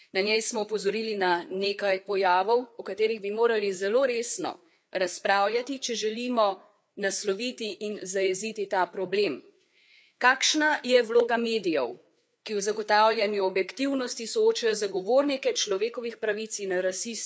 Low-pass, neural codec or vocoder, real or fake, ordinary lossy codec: none; codec, 16 kHz, 4 kbps, FreqCodec, larger model; fake; none